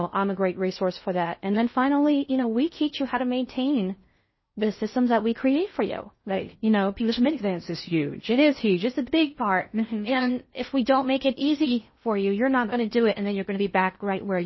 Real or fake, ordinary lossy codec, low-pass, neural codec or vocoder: fake; MP3, 24 kbps; 7.2 kHz; codec, 16 kHz in and 24 kHz out, 0.6 kbps, FocalCodec, streaming, 2048 codes